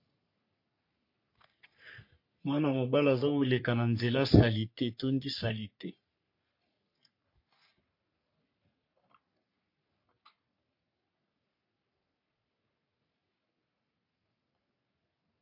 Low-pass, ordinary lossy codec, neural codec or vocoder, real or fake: 5.4 kHz; MP3, 32 kbps; codec, 44.1 kHz, 3.4 kbps, Pupu-Codec; fake